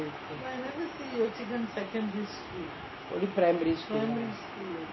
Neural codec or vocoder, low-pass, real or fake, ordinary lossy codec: none; 7.2 kHz; real; MP3, 24 kbps